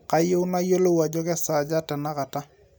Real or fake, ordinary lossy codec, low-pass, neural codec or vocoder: real; none; none; none